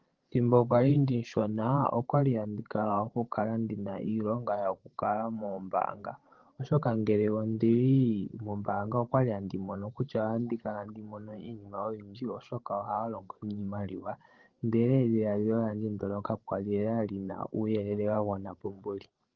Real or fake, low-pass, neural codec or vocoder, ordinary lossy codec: fake; 7.2 kHz; codec, 16 kHz, 16 kbps, FreqCodec, larger model; Opus, 16 kbps